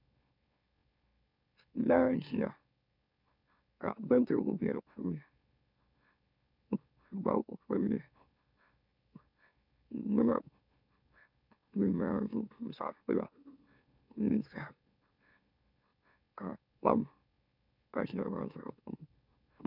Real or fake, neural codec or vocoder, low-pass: fake; autoencoder, 44.1 kHz, a latent of 192 numbers a frame, MeloTTS; 5.4 kHz